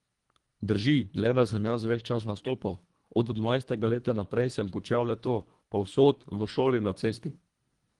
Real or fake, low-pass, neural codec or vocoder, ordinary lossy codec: fake; 10.8 kHz; codec, 24 kHz, 1.5 kbps, HILCodec; Opus, 24 kbps